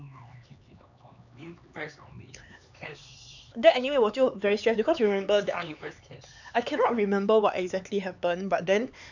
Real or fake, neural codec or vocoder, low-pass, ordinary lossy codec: fake; codec, 16 kHz, 4 kbps, X-Codec, HuBERT features, trained on LibriSpeech; 7.2 kHz; none